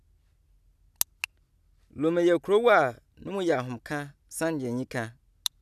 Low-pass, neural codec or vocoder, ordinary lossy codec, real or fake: 14.4 kHz; none; none; real